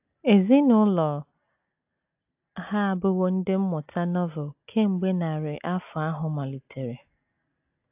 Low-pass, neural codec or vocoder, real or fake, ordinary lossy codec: 3.6 kHz; none; real; AAC, 32 kbps